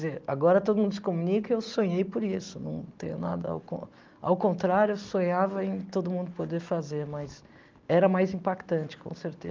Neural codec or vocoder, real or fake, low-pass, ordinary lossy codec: none; real; 7.2 kHz; Opus, 24 kbps